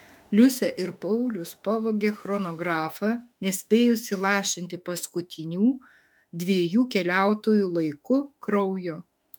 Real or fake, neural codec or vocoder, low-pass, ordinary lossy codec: fake; autoencoder, 48 kHz, 32 numbers a frame, DAC-VAE, trained on Japanese speech; 19.8 kHz; MP3, 96 kbps